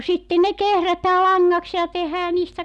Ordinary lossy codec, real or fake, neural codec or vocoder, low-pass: none; real; none; none